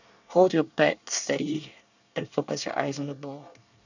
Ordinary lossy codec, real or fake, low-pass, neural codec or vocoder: none; fake; 7.2 kHz; codec, 24 kHz, 1 kbps, SNAC